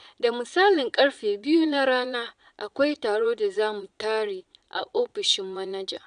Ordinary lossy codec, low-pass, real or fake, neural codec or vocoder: none; 9.9 kHz; fake; vocoder, 22.05 kHz, 80 mel bands, Vocos